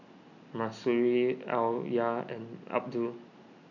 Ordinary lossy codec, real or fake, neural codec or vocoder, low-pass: none; real; none; 7.2 kHz